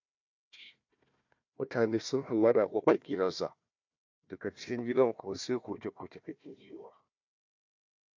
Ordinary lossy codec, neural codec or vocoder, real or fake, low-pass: MP3, 48 kbps; codec, 16 kHz, 1 kbps, FunCodec, trained on Chinese and English, 50 frames a second; fake; 7.2 kHz